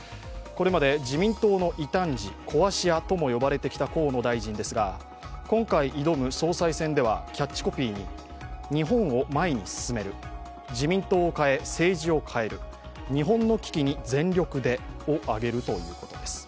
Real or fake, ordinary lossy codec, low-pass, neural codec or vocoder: real; none; none; none